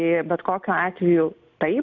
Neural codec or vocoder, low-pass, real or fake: none; 7.2 kHz; real